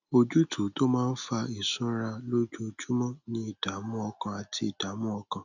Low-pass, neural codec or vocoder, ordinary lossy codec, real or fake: 7.2 kHz; none; none; real